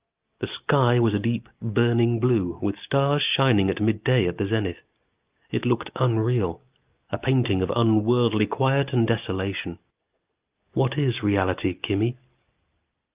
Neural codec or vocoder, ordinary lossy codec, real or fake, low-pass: none; Opus, 24 kbps; real; 3.6 kHz